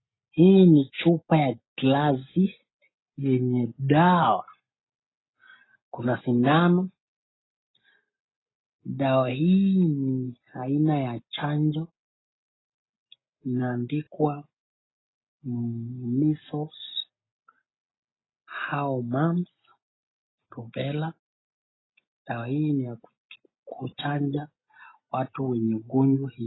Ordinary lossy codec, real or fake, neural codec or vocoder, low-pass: AAC, 16 kbps; real; none; 7.2 kHz